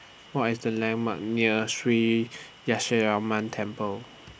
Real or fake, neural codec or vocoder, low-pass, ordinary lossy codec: real; none; none; none